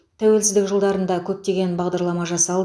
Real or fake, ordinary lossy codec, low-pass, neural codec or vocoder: real; none; none; none